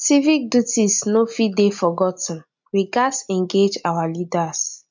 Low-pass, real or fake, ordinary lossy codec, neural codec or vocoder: 7.2 kHz; real; MP3, 64 kbps; none